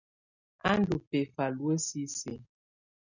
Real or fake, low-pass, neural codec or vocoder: real; 7.2 kHz; none